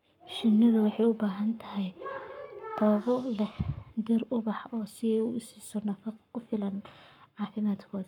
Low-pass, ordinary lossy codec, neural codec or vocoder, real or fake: 19.8 kHz; none; codec, 44.1 kHz, 7.8 kbps, Pupu-Codec; fake